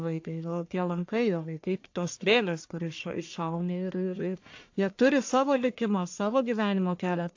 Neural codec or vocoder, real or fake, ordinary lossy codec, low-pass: codec, 44.1 kHz, 1.7 kbps, Pupu-Codec; fake; AAC, 48 kbps; 7.2 kHz